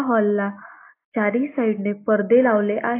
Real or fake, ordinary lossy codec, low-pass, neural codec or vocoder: real; AAC, 24 kbps; 3.6 kHz; none